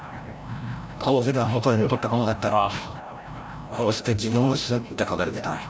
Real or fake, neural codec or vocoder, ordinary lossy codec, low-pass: fake; codec, 16 kHz, 0.5 kbps, FreqCodec, larger model; none; none